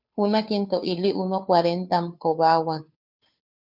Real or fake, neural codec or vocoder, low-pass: fake; codec, 16 kHz, 2 kbps, FunCodec, trained on Chinese and English, 25 frames a second; 5.4 kHz